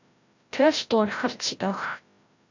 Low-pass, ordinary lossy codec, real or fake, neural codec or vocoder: 7.2 kHz; AAC, 48 kbps; fake; codec, 16 kHz, 0.5 kbps, FreqCodec, larger model